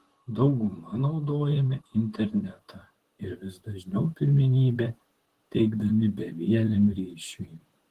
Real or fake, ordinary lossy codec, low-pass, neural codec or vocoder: fake; Opus, 16 kbps; 14.4 kHz; vocoder, 44.1 kHz, 128 mel bands, Pupu-Vocoder